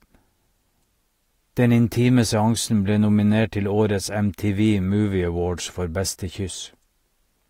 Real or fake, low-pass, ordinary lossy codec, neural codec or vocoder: real; 19.8 kHz; AAC, 48 kbps; none